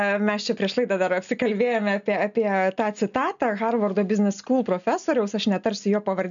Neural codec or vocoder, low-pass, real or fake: none; 7.2 kHz; real